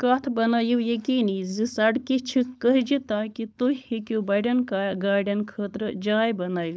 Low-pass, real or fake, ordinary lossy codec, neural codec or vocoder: none; fake; none; codec, 16 kHz, 4.8 kbps, FACodec